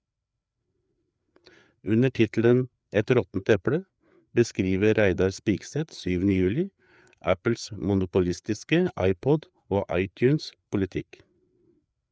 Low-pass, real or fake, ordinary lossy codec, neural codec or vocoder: none; fake; none; codec, 16 kHz, 4 kbps, FreqCodec, larger model